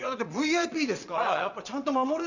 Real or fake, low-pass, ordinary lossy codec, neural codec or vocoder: fake; 7.2 kHz; none; vocoder, 22.05 kHz, 80 mel bands, WaveNeXt